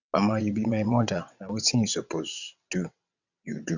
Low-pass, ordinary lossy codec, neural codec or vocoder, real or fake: 7.2 kHz; none; vocoder, 22.05 kHz, 80 mel bands, WaveNeXt; fake